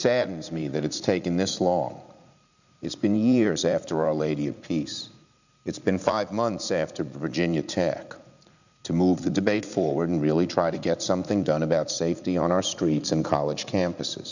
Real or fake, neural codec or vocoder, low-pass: fake; vocoder, 44.1 kHz, 80 mel bands, Vocos; 7.2 kHz